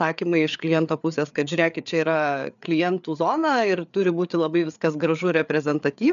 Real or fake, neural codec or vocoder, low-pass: fake; codec, 16 kHz, 4 kbps, FreqCodec, larger model; 7.2 kHz